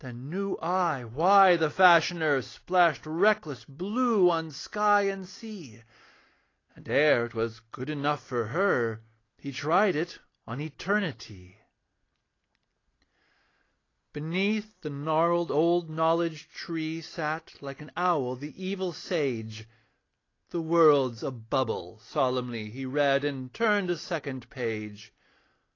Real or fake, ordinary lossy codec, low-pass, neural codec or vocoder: real; AAC, 32 kbps; 7.2 kHz; none